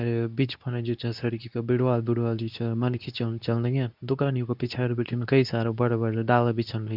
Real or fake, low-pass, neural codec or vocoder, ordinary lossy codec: fake; 5.4 kHz; codec, 24 kHz, 0.9 kbps, WavTokenizer, medium speech release version 2; none